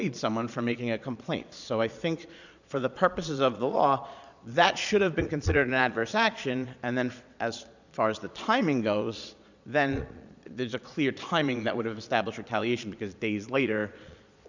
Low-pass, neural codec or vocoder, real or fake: 7.2 kHz; vocoder, 22.05 kHz, 80 mel bands, Vocos; fake